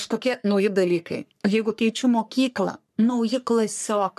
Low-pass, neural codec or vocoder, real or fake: 14.4 kHz; codec, 44.1 kHz, 3.4 kbps, Pupu-Codec; fake